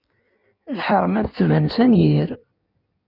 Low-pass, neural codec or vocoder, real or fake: 5.4 kHz; codec, 16 kHz in and 24 kHz out, 1.1 kbps, FireRedTTS-2 codec; fake